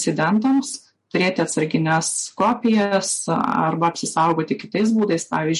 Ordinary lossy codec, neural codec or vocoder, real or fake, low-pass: MP3, 48 kbps; none; real; 14.4 kHz